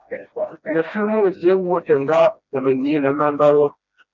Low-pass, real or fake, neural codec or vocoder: 7.2 kHz; fake; codec, 16 kHz, 1 kbps, FreqCodec, smaller model